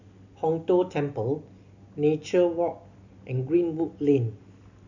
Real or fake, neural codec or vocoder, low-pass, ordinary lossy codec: real; none; 7.2 kHz; none